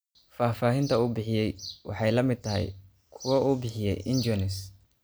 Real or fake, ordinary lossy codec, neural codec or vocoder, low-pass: real; none; none; none